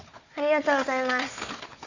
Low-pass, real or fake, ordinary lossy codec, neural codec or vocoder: 7.2 kHz; fake; none; codec, 16 kHz, 4 kbps, FunCodec, trained on Chinese and English, 50 frames a second